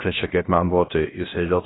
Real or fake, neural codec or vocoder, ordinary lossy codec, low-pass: fake; codec, 16 kHz, 0.3 kbps, FocalCodec; AAC, 16 kbps; 7.2 kHz